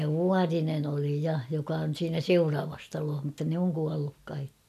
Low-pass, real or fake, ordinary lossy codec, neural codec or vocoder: 14.4 kHz; real; none; none